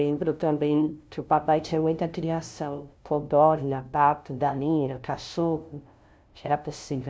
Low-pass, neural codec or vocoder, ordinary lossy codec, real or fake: none; codec, 16 kHz, 0.5 kbps, FunCodec, trained on LibriTTS, 25 frames a second; none; fake